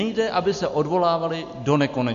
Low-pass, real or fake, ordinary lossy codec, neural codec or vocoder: 7.2 kHz; real; MP3, 48 kbps; none